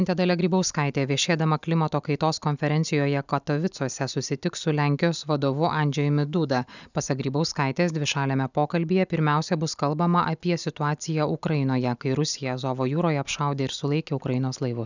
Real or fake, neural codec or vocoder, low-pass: real; none; 7.2 kHz